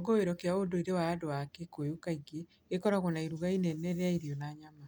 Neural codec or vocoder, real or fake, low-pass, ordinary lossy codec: none; real; none; none